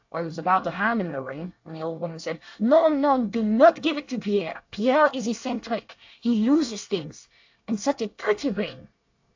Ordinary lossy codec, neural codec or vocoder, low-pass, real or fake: AAC, 48 kbps; codec, 24 kHz, 1 kbps, SNAC; 7.2 kHz; fake